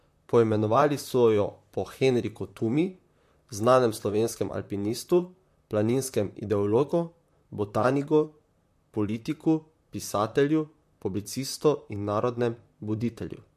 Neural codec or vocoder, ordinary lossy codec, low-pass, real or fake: vocoder, 44.1 kHz, 128 mel bands, Pupu-Vocoder; MP3, 64 kbps; 14.4 kHz; fake